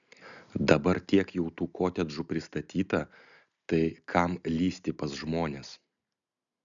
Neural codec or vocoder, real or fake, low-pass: none; real; 7.2 kHz